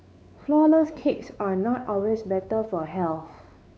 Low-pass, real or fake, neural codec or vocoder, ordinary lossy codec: none; fake; codec, 16 kHz, 4 kbps, X-Codec, WavLM features, trained on Multilingual LibriSpeech; none